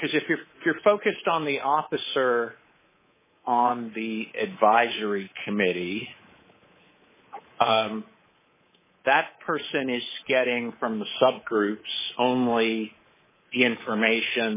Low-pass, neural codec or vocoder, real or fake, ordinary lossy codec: 3.6 kHz; codec, 24 kHz, 3.1 kbps, DualCodec; fake; MP3, 16 kbps